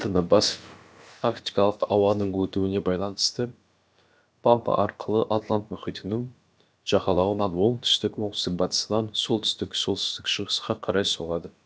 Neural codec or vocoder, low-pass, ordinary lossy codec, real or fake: codec, 16 kHz, about 1 kbps, DyCAST, with the encoder's durations; none; none; fake